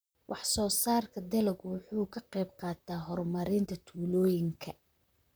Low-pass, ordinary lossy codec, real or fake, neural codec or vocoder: none; none; fake; vocoder, 44.1 kHz, 128 mel bands, Pupu-Vocoder